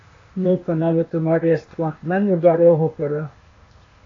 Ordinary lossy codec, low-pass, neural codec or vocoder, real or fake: AAC, 32 kbps; 7.2 kHz; codec, 16 kHz, 0.8 kbps, ZipCodec; fake